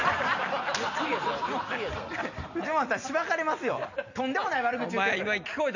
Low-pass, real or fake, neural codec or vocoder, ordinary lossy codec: 7.2 kHz; real; none; none